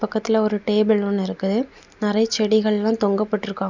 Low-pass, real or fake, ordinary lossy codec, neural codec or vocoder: 7.2 kHz; real; none; none